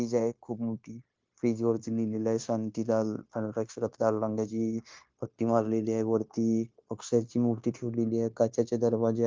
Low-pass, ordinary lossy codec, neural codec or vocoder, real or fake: 7.2 kHz; Opus, 24 kbps; codec, 16 kHz, 0.9 kbps, LongCat-Audio-Codec; fake